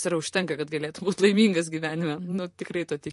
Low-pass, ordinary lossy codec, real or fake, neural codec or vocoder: 14.4 kHz; MP3, 48 kbps; fake; vocoder, 44.1 kHz, 128 mel bands, Pupu-Vocoder